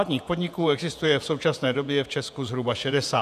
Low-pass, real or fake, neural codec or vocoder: 14.4 kHz; fake; vocoder, 48 kHz, 128 mel bands, Vocos